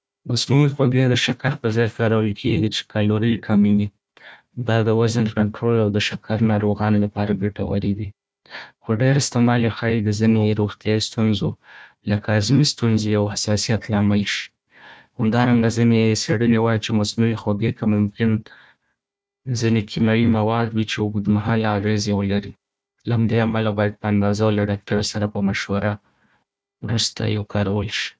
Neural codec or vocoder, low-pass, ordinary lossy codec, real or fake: codec, 16 kHz, 1 kbps, FunCodec, trained on Chinese and English, 50 frames a second; none; none; fake